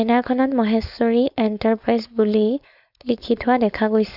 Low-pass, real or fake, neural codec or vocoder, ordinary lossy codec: 5.4 kHz; fake; codec, 16 kHz, 4.8 kbps, FACodec; none